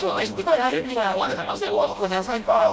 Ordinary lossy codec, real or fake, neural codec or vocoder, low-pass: none; fake; codec, 16 kHz, 0.5 kbps, FreqCodec, smaller model; none